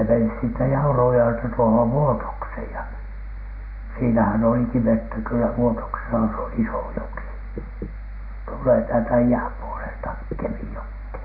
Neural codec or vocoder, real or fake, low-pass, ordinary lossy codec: none; real; 5.4 kHz; none